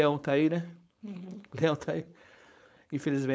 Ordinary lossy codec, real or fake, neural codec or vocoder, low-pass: none; fake; codec, 16 kHz, 4.8 kbps, FACodec; none